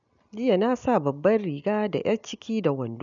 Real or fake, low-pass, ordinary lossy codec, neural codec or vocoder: real; 7.2 kHz; none; none